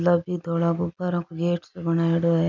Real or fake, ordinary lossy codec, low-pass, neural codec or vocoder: real; none; 7.2 kHz; none